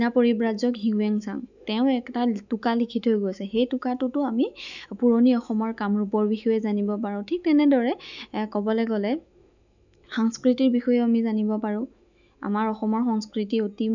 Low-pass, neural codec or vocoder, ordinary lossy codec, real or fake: 7.2 kHz; autoencoder, 48 kHz, 128 numbers a frame, DAC-VAE, trained on Japanese speech; none; fake